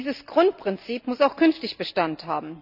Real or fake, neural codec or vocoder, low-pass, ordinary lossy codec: real; none; 5.4 kHz; none